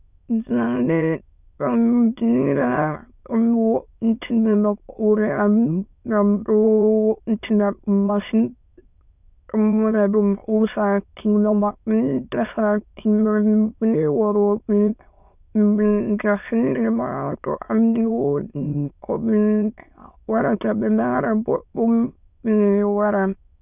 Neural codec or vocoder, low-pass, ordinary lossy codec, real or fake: autoencoder, 22.05 kHz, a latent of 192 numbers a frame, VITS, trained on many speakers; 3.6 kHz; none; fake